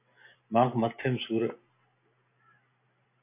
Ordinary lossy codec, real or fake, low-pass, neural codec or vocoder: MP3, 24 kbps; real; 3.6 kHz; none